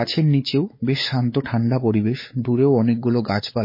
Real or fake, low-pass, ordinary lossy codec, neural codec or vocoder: fake; 5.4 kHz; MP3, 24 kbps; codec, 16 kHz, 4 kbps, X-Codec, WavLM features, trained on Multilingual LibriSpeech